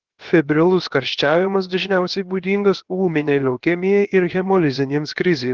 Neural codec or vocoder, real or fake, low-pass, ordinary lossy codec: codec, 16 kHz, 0.7 kbps, FocalCodec; fake; 7.2 kHz; Opus, 16 kbps